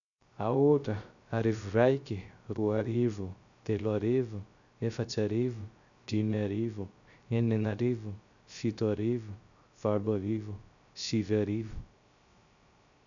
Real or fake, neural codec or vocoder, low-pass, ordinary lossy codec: fake; codec, 16 kHz, 0.3 kbps, FocalCodec; 7.2 kHz; none